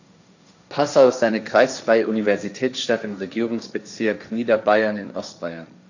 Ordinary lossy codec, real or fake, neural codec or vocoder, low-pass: none; fake; codec, 16 kHz, 1.1 kbps, Voila-Tokenizer; 7.2 kHz